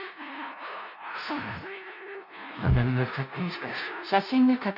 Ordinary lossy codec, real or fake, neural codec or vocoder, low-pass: none; fake; codec, 16 kHz, 0.5 kbps, FunCodec, trained on LibriTTS, 25 frames a second; 5.4 kHz